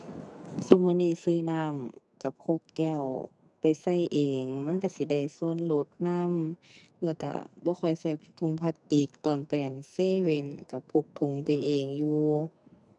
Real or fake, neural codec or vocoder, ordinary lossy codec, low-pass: fake; codec, 44.1 kHz, 2.6 kbps, SNAC; none; 10.8 kHz